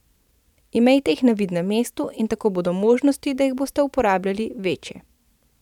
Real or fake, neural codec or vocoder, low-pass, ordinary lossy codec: fake; vocoder, 44.1 kHz, 128 mel bands every 512 samples, BigVGAN v2; 19.8 kHz; none